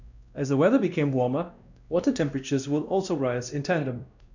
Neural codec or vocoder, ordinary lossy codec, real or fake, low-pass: codec, 16 kHz, 1 kbps, X-Codec, WavLM features, trained on Multilingual LibriSpeech; none; fake; 7.2 kHz